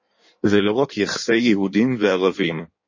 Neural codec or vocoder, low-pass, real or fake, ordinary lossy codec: codec, 16 kHz in and 24 kHz out, 1.1 kbps, FireRedTTS-2 codec; 7.2 kHz; fake; MP3, 32 kbps